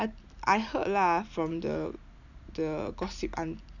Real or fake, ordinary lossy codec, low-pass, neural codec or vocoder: real; none; 7.2 kHz; none